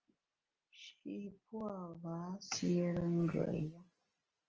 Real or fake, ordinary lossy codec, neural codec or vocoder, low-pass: real; Opus, 24 kbps; none; 7.2 kHz